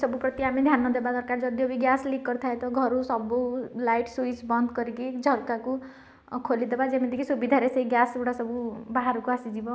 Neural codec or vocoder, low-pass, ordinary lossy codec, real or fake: none; none; none; real